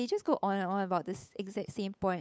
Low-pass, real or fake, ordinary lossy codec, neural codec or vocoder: none; fake; none; codec, 16 kHz, 8 kbps, FunCodec, trained on Chinese and English, 25 frames a second